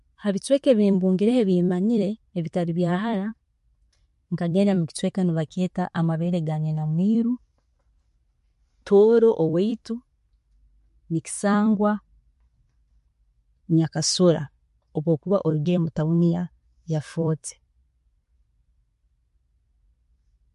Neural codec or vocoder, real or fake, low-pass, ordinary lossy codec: vocoder, 44.1 kHz, 128 mel bands every 256 samples, BigVGAN v2; fake; 14.4 kHz; MP3, 48 kbps